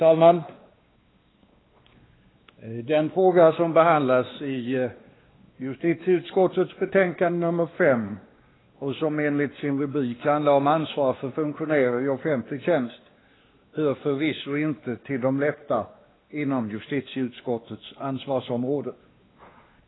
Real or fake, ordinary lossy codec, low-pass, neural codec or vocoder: fake; AAC, 16 kbps; 7.2 kHz; codec, 16 kHz, 2 kbps, X-Codec, WavLM features, trained on Multilingual LibriSpeech